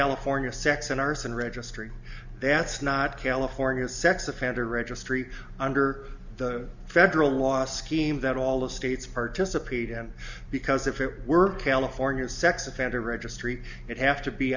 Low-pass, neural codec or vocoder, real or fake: 7.2 kHz; none; real